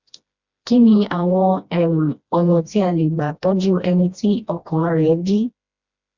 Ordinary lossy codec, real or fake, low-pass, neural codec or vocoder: Opus, 64 kbps; fake; 7.2 kHz; codec, 16 kHz, 1 kbps, FreqCodec, smaller model